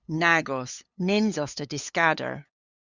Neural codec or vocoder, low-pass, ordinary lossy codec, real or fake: codec, 16 kHz, 8 kbps, FunCodec, trained on LibriTTS, 25 frames a second; 7.2 kHz; Opus, 64 kbps; fake